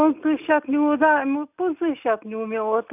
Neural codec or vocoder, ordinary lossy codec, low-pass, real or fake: none; none; 3.6 kHz; real